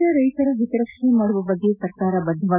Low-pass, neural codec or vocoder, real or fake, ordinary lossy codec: 3.6 kHz; none; real; none